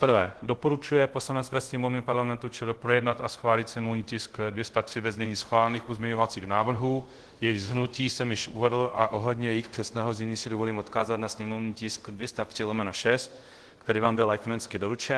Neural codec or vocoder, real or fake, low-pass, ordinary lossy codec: codec, 24 kHz, 0.5 kbps, DualCodec; fake; 10.8 kHz; Opus, 16 kbps